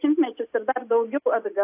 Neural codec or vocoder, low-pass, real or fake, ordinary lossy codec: none; 3.6 kHz; real; AAC, 32 kbps